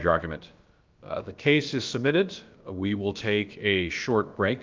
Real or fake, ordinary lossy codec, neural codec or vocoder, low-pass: fake; Opus, 24 kbps; codec, 16 kHz, about 1 kbps, DyCAST, with the encoder's durations; 7.2 kHz